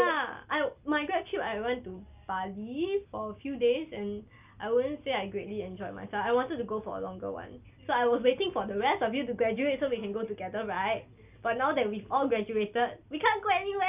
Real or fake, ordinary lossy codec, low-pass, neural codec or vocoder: real; none; 3.6 kHz; none